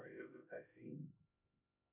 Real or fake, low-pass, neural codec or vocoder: fake; 3.6 kHz; codec, 16 kHz, 0.5 kbps, X-Codec, HuBERT features, trained on LibriSpeech